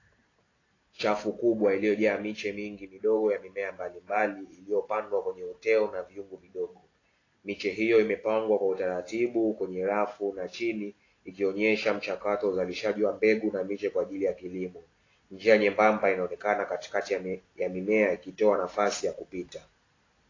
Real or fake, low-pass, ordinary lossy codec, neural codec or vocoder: real; 7.2 kHz; AAC, 32 kbps; none